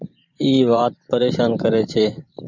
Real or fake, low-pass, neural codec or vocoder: fake; 7.2 kHz; vocoder, 44.1 kHz, 128 mel bands every 512 samples, BigVGAN v2